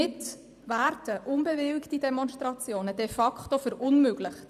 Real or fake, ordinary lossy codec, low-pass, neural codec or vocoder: fake; none; 14.4 kHz; vocoder, 44.1 kHz, 128 mel bands every 512 samples, BigVGAN v2